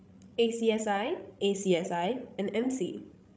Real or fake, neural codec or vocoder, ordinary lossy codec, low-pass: fake; codec, 16 kHz, 16 kbps, FreqCodec, larger model; none; none